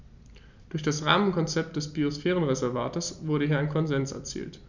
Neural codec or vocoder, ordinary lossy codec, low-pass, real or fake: none; none; 7.2 kHz; real